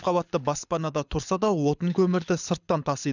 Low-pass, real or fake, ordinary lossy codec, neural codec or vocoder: 7.2 kHz; fake; none; codec, 24 kHz, 6 kbps, HILCodec